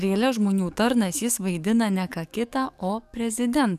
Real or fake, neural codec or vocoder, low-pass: fake; codec, 44.1 kHz, 7.8 kbps, DAC; 14.4 kHz